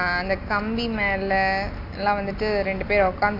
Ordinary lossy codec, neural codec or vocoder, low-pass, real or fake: AAC, 32 kbps; none; 5.4 kHz; real